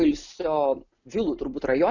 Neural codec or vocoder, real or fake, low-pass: none; real; 7.2 kHz